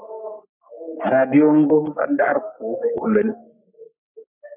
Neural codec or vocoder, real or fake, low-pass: codec, 44.1 kHz, 3.4 kbps, Pupu-Codec; fake; 3.6 kHz